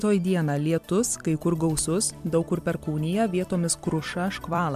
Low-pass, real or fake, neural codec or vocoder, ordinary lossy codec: 14.4 kHz; fake; vocoder, 44.1 kHz, 128 mel bands every 256 samples, BigVGAN v2; MP3, 96 kbps